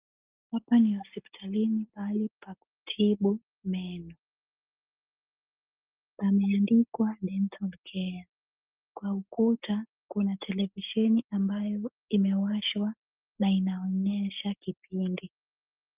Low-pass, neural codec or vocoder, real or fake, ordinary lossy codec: 3.6 kHz; none; real; Opus, 24 kbps